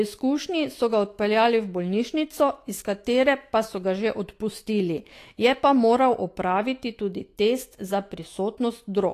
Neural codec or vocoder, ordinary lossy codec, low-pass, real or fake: autoencoder, 48 kHz, 128 numbers a frame, DAC-VAE, trained on Japanese speech; AAC, 48 kbps; 14.4 kHz; fake